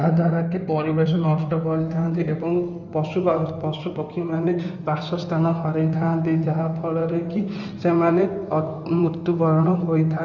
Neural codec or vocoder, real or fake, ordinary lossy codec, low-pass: codec, 16 kHz in and 24 kHz out, 2.2 kbps, FireRedTTS-2 codec; fake; none; 7.2 kHz